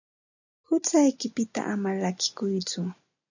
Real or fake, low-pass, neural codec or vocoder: real; 7.2 kHz; none